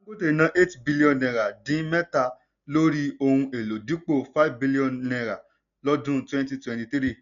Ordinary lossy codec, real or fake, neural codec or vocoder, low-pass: none; real; none; 7.2 kHz